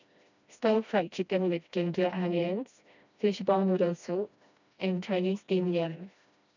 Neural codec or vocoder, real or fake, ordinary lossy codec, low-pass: codec, 16 kHz, 1 kbps, FreqCodec, smaller model; fake; none; 7.2 kHz